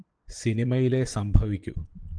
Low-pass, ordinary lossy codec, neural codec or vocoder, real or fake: 9.9 kHz; Opus, 24 kbps; vocoder, 22.05 kHz, 80 mel bands, Vocos; fake